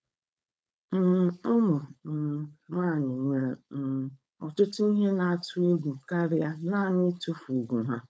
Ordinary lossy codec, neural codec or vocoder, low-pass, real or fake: none; codec, 16 kHz, 4.8 kbps, FACodec; none; fake